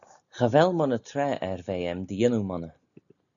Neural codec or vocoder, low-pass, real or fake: none; 7.2 kHz; real